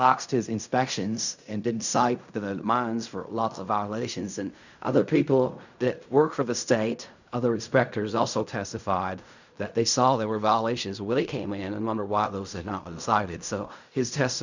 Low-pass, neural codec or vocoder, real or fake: 7.2 kHz; codec, 16 kHz in and 24 kHz out, 0.4 kbps, LongCat-Audio-Codec, fine tuned four codebook decoder; fake